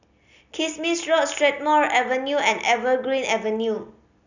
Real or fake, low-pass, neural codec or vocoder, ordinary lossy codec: real; 7.2 kHz; none; none